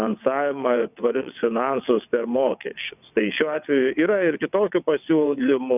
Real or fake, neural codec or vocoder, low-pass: fake; vocoder, 22.05 kHz, 80 mel bands, WaveNeXt; 3.6 kHz